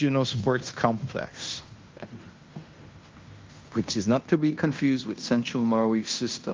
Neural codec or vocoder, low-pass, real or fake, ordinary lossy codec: codec, 16 kHz in and 24 kHz out, 0.9 kbps, LongCat-Audio-Codec, fine tuned four codebook decoder; 7.2 kHz; fake; Opus, 32 kbps